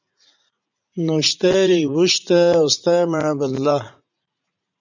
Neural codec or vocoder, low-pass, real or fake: vocoder, 44.1 kHz, 80 mel bands, Vocos; 7.2 kHz; fake